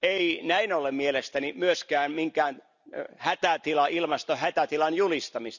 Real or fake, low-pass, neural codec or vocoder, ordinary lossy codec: real; 7.2 kHz; none; none